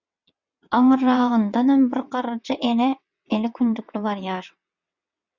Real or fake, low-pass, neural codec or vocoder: fake; 7.2 kHz; vocoder, 22.05 kHz, 80 mel bands, WaveNeXt